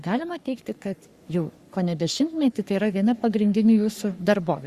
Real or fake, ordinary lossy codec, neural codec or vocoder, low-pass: fake; Opus, 64 kbps; codec, 44.1 kHz, 3.4 kbps, Pupu-Codec; 14.4 kHz